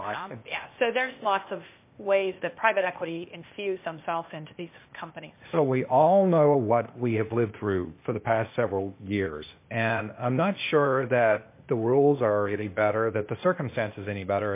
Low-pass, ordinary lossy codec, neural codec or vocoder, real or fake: 3.6 kHz; MP3, 24 kbps; codec, 16 kHz, 0.8 kbps, ZipCodec; fake